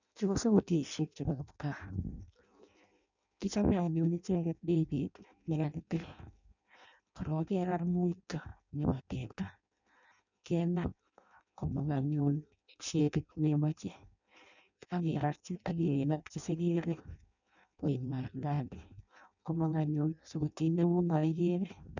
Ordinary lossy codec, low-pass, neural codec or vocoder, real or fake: none; 7.2 kHz; codec, 16 kHz in and 24 kHz out, 0.6 kbps, FireRedTTS-2 codec; fake